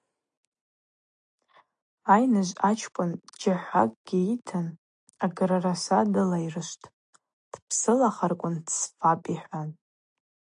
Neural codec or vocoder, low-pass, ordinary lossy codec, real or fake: none; 9.9 kHz; AAC, 64 kbps; real